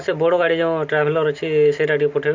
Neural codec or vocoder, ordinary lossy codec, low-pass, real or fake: none; MP3, 64 kbps; 7.2 kHz; real